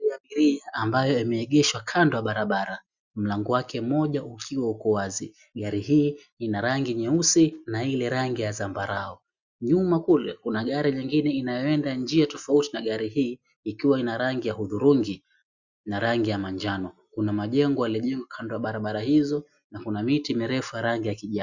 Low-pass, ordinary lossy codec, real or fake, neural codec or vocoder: 7.2 kHz; Opus, 64 kbps; real; none